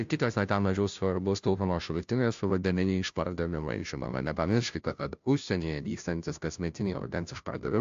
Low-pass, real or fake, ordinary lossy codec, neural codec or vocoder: 7.2 kHz; fake; AAC, 64 kbps; codec, 16 kHz, 0.5 kbps, FunCodec, trained on Chinese and English, 25 frames a second